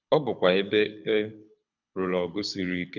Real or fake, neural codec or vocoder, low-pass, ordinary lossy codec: fake; codec, 24 kHz, 6 kbps, HILCodec; 7.2 kHz; none